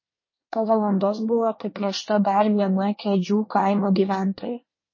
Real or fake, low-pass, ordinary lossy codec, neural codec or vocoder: fake; 7.2 kHz; MP3, 32 kbps; codec, 24 kHz, 1 kbps, SNAC